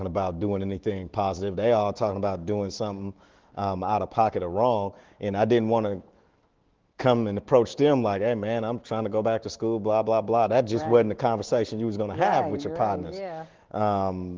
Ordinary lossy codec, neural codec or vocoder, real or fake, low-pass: Opus, 16 kbps; autoencoder, 48 kHz, 128 numbers a frame, DAC-VAE, trained on Japanese speech; fake; 7.2 kHz